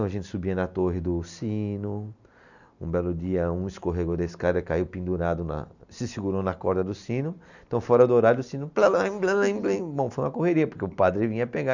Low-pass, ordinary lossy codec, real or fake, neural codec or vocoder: 7.2 kHz; none; real; none